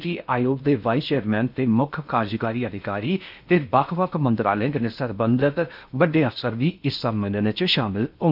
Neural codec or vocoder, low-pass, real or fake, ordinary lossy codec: codec, 16 kHz in and 24 kHz out, 0.6 kbps, FocalCodec, streaming, 2048 codes; 5.4 kHz; fake; none